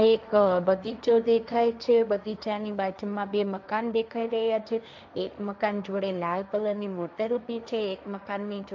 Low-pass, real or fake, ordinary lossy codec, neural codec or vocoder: none; fake; none; codec, 16 kHz, 1.1 kbps, Voila-Tokenizer